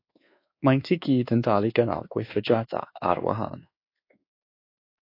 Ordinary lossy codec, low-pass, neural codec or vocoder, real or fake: AAC, 32 kbps; 5.4 kHz; codec, 24 kHz, 1.2 kbps, DualCodec; fake